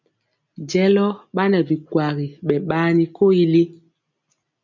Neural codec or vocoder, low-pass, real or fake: none; 7.2 kHz; real